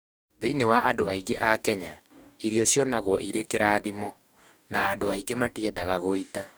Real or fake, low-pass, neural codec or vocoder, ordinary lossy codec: fake; none; codec, 44.1 kHz, 2.6 kbps, DAC; none